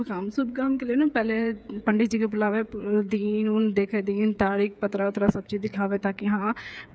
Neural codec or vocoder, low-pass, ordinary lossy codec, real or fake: codec, 16 kHz, 8 kbps, FreqCodec, smaller model; none; none; fake